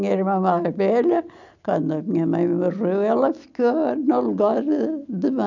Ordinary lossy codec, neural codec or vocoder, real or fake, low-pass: none; none; real; 7.2 kHz